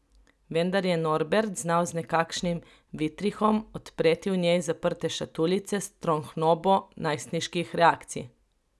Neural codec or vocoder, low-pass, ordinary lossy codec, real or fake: none; none; none; real